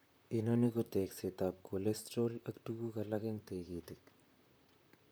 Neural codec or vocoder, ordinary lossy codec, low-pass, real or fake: vocoder, 44.1 kHz, 128 mel bands, Pupu-Vocoder; none; none; fake